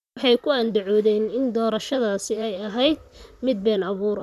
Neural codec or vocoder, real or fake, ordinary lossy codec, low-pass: vocoder, 44.1 kHz, 128 mel bands, Pupu-Vocoder; fake; none; 14.4 kHz